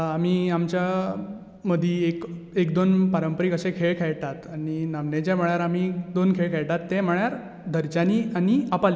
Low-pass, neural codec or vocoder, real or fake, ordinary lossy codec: none; none; real; none